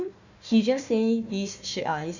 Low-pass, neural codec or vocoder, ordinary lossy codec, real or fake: 7.2 kHz; codec, 16 kHz, 1 kbps, FunCodec, trained on Chinese and English, 50 frames a second; none; fake